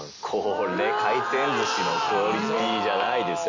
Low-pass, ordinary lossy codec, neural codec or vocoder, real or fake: 7.2 kHz; none; none; real